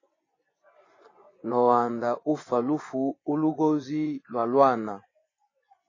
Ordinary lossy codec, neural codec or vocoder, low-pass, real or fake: AAC, 32 kbps; vocoder, 24 kHz, 100 mel bands, Vocos; 7.2 kHz; fake